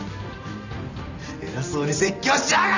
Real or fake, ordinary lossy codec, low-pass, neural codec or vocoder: real; none; 7.2 kHz; none